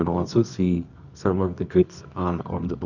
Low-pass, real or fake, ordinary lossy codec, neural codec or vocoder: 7.2 kHz; fake; none; codec, 24 kHz, 0.9 kbps, WavTokenizer, medium music audio release